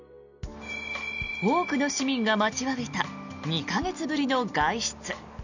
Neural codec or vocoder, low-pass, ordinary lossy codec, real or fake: none; 7.2 kHz; none; real